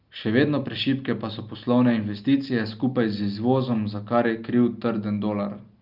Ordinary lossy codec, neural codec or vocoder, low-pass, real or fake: Opus, 24 kbps; none; 5.4 kHz; real